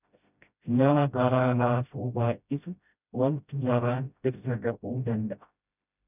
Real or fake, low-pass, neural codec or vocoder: fake; 3.6 kHz; codec, 16 kHz, 0.5 kbps, FreqCodec, smaller model